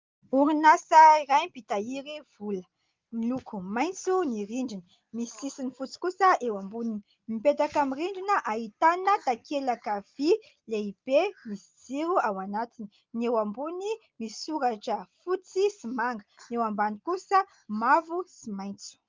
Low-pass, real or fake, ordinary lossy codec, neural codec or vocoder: 7.2 kHz; real; Opus, 24 kbps; none